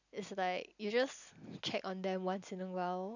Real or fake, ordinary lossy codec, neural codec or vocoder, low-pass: real; none; none; 7.2 kHz